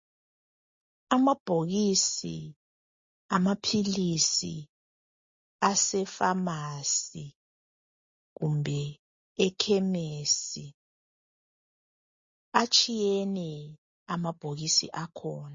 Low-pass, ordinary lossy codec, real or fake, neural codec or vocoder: 7.2 kHz; MP3, 32 kbps; real; none